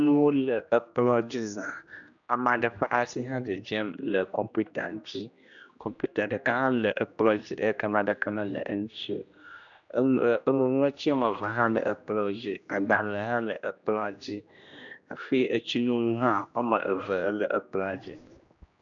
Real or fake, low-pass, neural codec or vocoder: fake; 7.2 kHz; codec, 16 kHz, 1 kbps, X-Codec, HuBERT features, trained on general audio